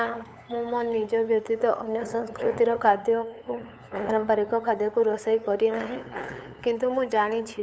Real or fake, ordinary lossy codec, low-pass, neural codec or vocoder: fake; none; none; codec, 16 kHz, 8 kbps, FunCodec, trained on LibriTTS, 25 frames a second